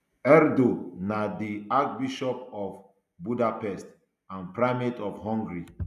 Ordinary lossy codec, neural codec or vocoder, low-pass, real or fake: none; none; 14.4 kHz; real